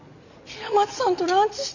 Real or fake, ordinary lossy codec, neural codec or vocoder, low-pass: real; none; none; 7.2 kHz